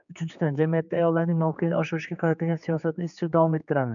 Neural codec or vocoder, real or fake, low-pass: codec, 16 kHz, 4 kbps, X-Codec, HuBERT features, trained on general audio; fake; 7.2 kHz